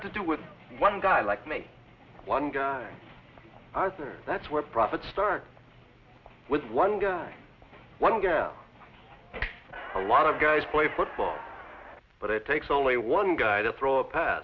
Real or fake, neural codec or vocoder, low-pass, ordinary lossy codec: real; none; 7.2 kHz; Opus, 64 kbps